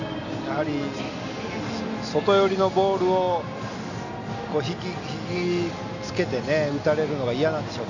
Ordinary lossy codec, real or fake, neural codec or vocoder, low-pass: none; real; none; 7.2 kHz